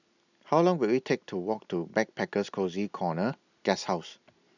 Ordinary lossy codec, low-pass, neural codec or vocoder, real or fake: none; 7.2 kHz; none; real